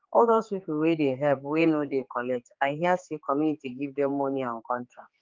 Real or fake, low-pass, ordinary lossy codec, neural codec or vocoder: fake; 7.2 kHz; Opus, 32 kbps; codec, 16 kHz, 4 kbps, X-Codec, HuBERT features, trained on general audio